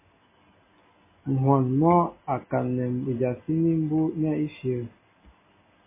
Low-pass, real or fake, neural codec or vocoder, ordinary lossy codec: 3.6 kHz; real; none; MP3, 16 kbps